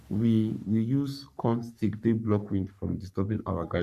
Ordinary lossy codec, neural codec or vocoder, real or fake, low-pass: none; codec, 44.1 kHz, 3.4 kbps, Pupu-Codec; fake; 14.4 kHz